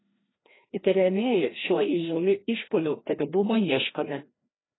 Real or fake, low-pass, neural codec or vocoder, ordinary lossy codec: fake; 7.2 kHz; codec, 16 kHz, 1 kbps, FreqCodec, larger model; AAC, 16 kbps